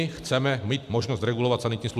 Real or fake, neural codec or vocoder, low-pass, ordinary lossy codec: real; none; 14.4 kHz; MP3, 96 kbps